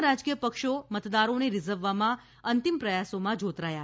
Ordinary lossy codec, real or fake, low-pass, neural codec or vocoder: none; real; none; none